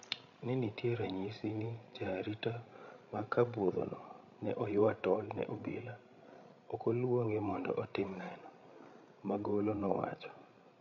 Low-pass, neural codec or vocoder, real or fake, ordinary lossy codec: 7.2 kHz; codec, 16 kHz, 16 kbps, FreqCodec, larger model; fake; none